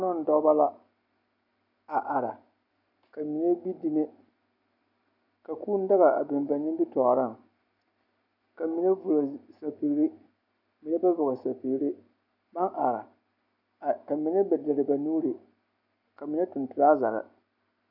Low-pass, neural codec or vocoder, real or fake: 5.4 kHz; none; real